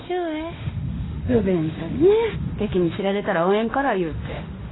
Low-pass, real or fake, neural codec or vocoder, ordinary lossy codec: 7.2 kHz; fake; autoencoder, 48 kHz, 32 numbers a frame, DAC-VAE, trained on Japanese speech; AAC, 16 kbps